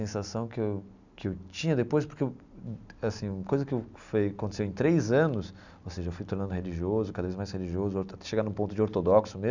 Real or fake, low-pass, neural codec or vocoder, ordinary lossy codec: real; 7.2 kHz; none; none